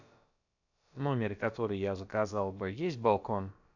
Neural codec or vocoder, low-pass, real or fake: codec, 16 kHz, about 1 kbps, DyCAST, with the encoder's durations; 7.2 kHz; fake